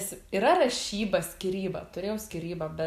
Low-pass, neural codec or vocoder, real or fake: 14.4 kHz; none; real